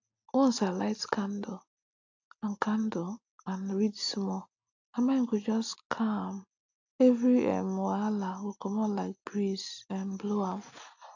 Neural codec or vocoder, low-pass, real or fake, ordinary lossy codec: none; 7.2 kHz; real; AAC, 48 kbps